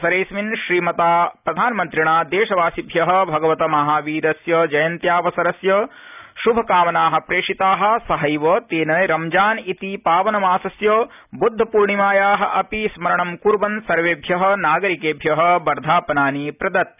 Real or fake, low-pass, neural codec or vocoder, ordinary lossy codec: real; 3.6 kHz; none; none